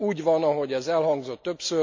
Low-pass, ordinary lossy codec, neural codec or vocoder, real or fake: 7.2 kHz; MP3, 48 kbps; none; real